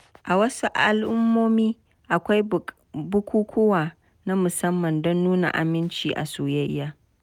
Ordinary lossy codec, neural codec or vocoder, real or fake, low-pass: none; none; real; 19.8 kHz